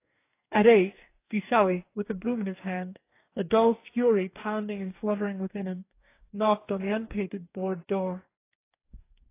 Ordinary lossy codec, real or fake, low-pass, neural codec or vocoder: AAC, 24 kbps; fake; 3.6 kHz; codec, 44.1 kHz, 2.6 kbps, DAC